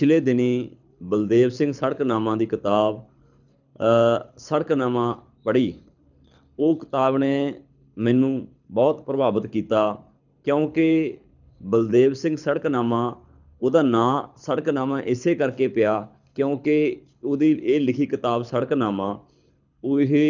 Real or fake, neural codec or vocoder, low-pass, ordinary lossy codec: fake; codec, 24 kHz, 6 kbps, HILCodec; 7.2 kHz; none